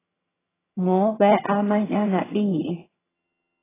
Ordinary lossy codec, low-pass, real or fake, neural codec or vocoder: AAC, 16 kbps; 3.6 kHz; fake; vocoder, 22.05 kHz, 80 mel bands, HiFi-GAN